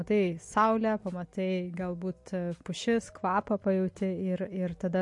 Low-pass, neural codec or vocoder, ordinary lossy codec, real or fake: 10.8 kHz; none; MP3, 48 kbps; real